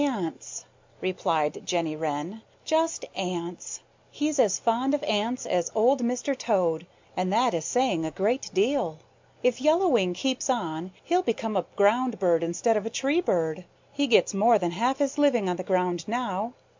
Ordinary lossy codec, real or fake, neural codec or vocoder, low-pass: MP3, 64 kbps; real; none; 7.2 kHz